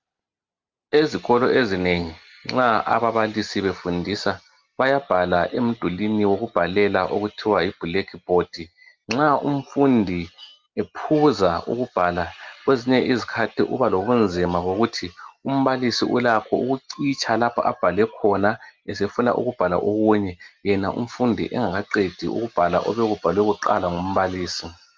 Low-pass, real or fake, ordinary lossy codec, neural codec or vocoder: 7.2 kHz; real; Opus, 32 kbps; none